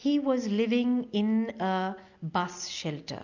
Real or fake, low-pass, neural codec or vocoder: real; 7.2 kHz; none